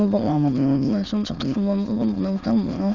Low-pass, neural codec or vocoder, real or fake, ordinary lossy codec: 7.2 kHz; autoencoder, 22.05 kHz, a latent of 192 numbers a frame, VITS, trained on many speakers; fake; none